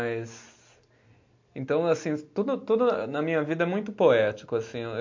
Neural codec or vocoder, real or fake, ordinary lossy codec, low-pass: none; real; MP3, 48 kbps; 7.2 kHz